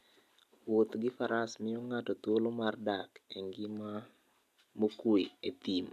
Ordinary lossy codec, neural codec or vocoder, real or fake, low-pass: none; none; real; 14.4 kHz